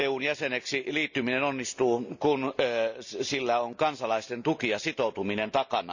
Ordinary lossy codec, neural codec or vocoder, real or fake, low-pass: none; none; real; 7.2 kHz